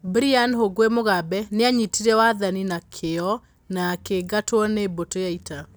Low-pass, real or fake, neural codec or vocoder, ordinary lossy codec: none; real; none; none